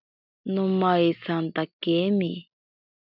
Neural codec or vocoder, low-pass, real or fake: none; 5.4 kHz; real